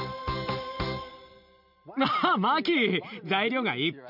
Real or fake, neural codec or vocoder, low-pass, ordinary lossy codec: real; none; 5.4 kHz; none